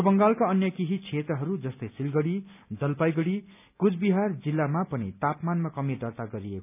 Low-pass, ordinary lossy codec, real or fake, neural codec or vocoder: 3.6 kHz; none; real; none